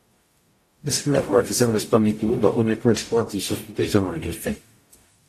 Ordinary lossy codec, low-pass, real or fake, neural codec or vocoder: AAC, 64 kbps; 14.4 kHz; fake; codec, 44.1 kHz, 0.9 kbps, DAC